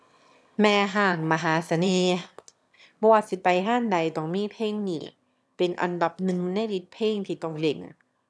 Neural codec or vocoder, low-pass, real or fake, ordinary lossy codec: autoencoder, 22.05 kHz, a latent of 192 numbers a frame, VITS, trained on one speaker; none; fake; none